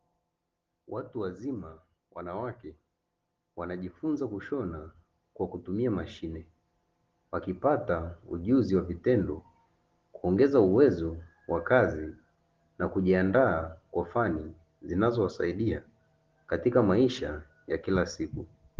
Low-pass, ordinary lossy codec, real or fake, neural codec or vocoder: 7.2 kHz; Opus, 16 kbps; real; none